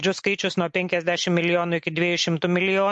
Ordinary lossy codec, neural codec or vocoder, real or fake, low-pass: MP3, 48 kbps; none; real; 10.8 kHz